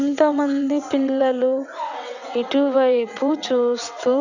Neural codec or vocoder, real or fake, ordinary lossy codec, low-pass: vocoder, 22.05 kHz, 80 mel bands, WaveNeXt; fake; none; 7.2 kHz